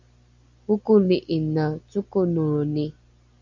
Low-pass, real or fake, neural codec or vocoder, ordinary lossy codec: 7.2 kHz; real; none; MP3, 64 kbps